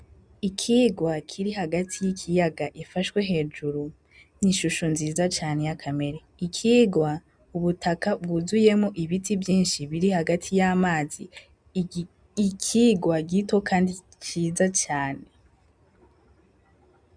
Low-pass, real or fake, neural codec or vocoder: 9.9 kHz; real; none